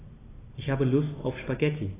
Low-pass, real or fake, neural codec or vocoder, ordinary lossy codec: 3.6 kHz; real; none; AAC, 16 kbps